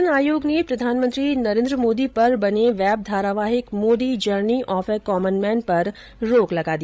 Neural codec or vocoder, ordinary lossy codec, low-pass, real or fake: codec, 16 kHz, 16 kbps, FreqCodec, larger model; none; none; fake